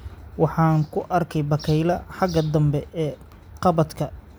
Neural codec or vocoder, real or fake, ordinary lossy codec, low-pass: none; real; none; none